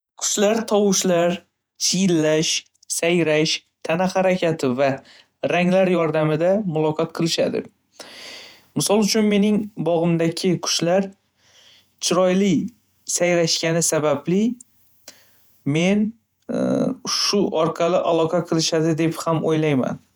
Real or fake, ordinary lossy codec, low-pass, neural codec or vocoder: fake; none; none; vocoder, 48 kHz, 128 mel bands, Vocos